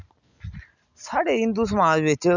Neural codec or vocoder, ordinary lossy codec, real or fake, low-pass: none; none; real; 7.2 kHz